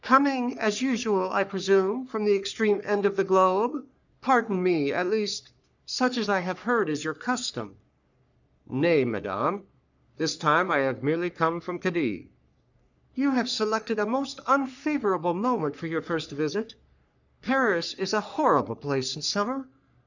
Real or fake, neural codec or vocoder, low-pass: fake; codec, 44.1 kHz, 3.4 kbps, Pupu-Codec; 7.2 kHz